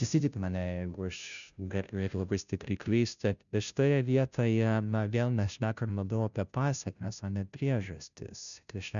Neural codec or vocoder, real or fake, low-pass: codec, 16 kHz, 0.5 kbps, FunCodec, trained on Chinese and English, 25 frames a second; fake; 7.2 kHz